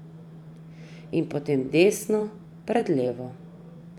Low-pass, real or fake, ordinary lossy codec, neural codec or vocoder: 19.8 kHz; fake; none; vocoder, 44.1 kHz, 128 mel bands every 512 samples, BigVGAN v2